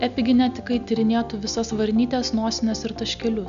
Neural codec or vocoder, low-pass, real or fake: none; 7.2 kHz; real